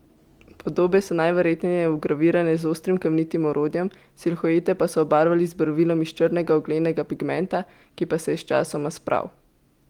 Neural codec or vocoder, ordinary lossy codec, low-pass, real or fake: none; Opus, 24 kbps; 19.8 kHz; real